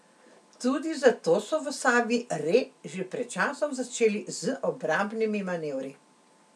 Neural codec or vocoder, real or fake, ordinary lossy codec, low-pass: none; real; none; none